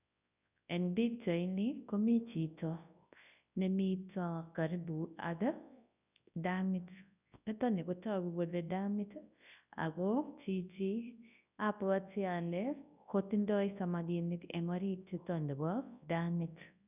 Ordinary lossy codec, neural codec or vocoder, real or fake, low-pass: none; codec, 24 kHz, 0.9 kbps, WavTokenizer, large speech release; fake; 3.6 kHz